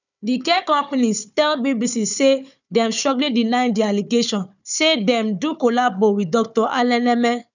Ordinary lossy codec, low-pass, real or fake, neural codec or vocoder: none; 7.2 kHz; fake; codec, 16 kHz, 4 kbps, FunCodec, trained on Chinese and English, 50 frames a second